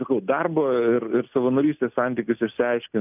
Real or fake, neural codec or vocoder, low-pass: real; none; 3.6 kHz